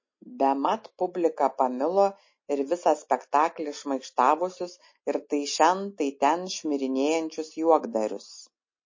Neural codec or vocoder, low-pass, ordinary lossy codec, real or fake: none; 7.2 kHz; MP3, 32 kbps; real